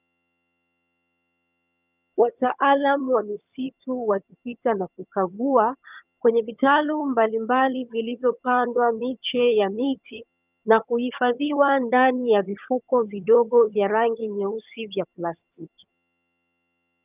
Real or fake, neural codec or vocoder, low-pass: fake; vocoder, 22.05 kHz, 80 mel bands, HiFi-GAN; 3.6 kHz